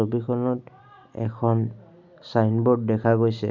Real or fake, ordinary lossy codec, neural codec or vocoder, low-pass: real; none; none; 7.2 kHz